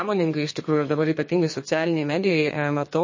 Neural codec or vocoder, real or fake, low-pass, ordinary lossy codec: codec, 32 kHz, 1.9 kbps, SNAC; fake; 7.2 kHz; MP3, 32 kbps